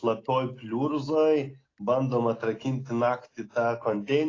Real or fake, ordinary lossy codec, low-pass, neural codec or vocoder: real; AAC, 32 kbps; 7.2 kHz; none